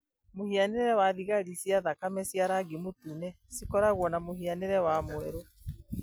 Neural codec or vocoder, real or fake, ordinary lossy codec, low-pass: none; real; none; none